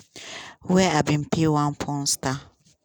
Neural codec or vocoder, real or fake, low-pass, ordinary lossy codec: none; real; none; none